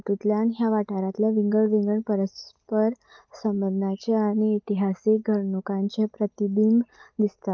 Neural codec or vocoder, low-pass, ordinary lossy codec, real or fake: none; 7.2 kHz; Opus, 24 kbps; real